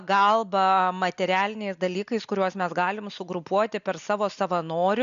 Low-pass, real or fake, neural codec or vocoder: 7.2 kHz; real; none